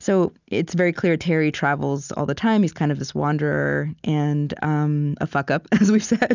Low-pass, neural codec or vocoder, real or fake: 7.2 kHz; none; real